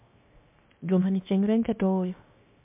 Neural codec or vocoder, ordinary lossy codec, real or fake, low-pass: codec, 16 kHz, 0.7 kbps, FocalCodec; MP3, 32 kbps; fake; 3.6 kHz